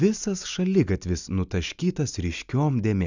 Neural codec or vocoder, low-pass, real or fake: none; 7.2 kHz; real